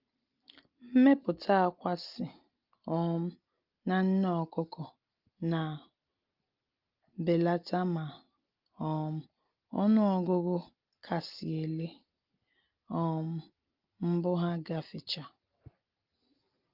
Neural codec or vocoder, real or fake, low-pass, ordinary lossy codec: none; real; 5.4 kHz; Opus, 24 kbps